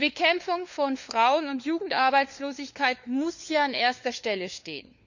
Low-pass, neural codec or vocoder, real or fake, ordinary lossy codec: 7.2 kHz; codec, 16 kHz, 4 kbps, FunCodec, trained on LibriTTS, 50 frames a second; fake; none